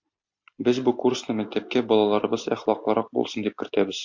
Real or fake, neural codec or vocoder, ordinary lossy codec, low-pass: real; none; MP3, 48 kbps; 7.2 kHz